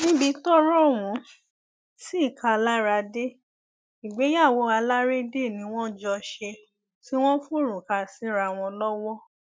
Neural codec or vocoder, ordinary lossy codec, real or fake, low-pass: none; none; real; none